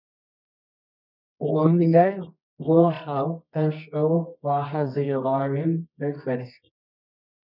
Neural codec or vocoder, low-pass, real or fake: codec, 24 kHz, 0.9 kbps, WavTokenizer, medium music audio release; 5.4 kHz; fake